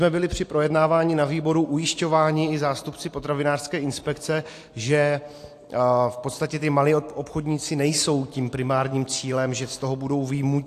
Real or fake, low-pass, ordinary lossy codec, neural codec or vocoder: real; 14.4 kHz; AAC, 64 kbps; none